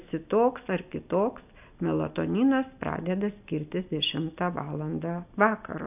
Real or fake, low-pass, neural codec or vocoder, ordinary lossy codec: real; 3.6 kHz; none; AAC, 32 kbps